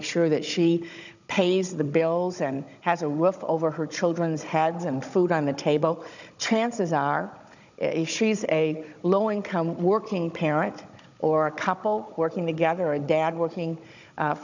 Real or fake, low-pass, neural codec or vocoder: fake; 7.2 kHz; codec, 16 kHz, 16 kbps, FunCodec, trained on Chinese and English, 50 frames a second